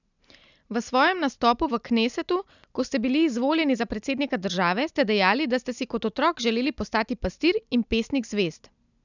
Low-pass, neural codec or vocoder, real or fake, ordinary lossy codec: 7.2 kHz; none; real; none